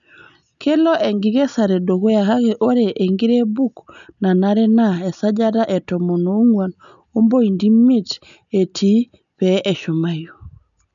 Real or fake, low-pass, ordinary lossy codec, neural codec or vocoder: real; 7.2 kHz; none; none